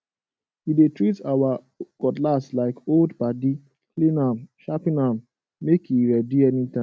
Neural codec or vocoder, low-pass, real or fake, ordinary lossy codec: none; none; real; none